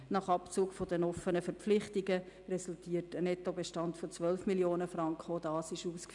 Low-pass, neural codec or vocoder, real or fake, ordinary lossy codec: 10.8 kHz; none; real; none